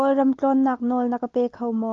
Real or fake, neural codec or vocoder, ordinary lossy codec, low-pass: real; none; Opus, 24 kbps; 7.2 kHz